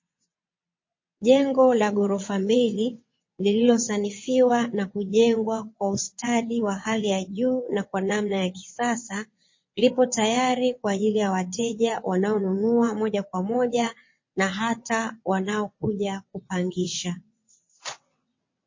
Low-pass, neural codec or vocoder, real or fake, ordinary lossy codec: 7.2 kHz; vocoder, 22.05 kHz, 80 mel bands, WaveNeXt; fake; MP3, 32 kbps